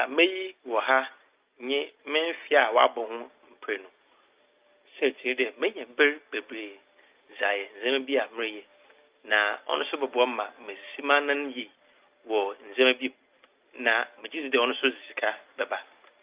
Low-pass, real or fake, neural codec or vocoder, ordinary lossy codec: 3.6 kHz; real; none; Opus, 24 kbps